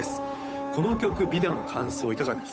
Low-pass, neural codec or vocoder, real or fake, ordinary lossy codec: none; codec, 16 kHz, 8 kbps, FunCodec, trained on Chinese and English, 25 frames a second; fake; none